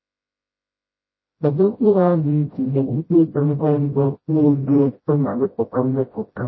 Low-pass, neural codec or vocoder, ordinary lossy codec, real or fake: 7.2 kHz; codec, 16 kHz, 0.5 kbps, FreqCodec, smaller model; MP3, 24 kbps; fake